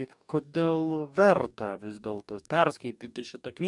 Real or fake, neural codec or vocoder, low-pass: fake; codec, 44.1 kHz, 2.6 kbps, DAC; 10.8 kHz